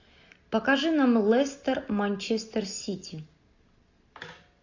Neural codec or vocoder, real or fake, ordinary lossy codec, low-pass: none; real; AAC, 48 kbps; 7.2 kHz